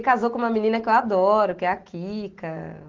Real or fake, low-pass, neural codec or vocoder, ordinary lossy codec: real; 7.2 kHz; none; Opus, 16 kbps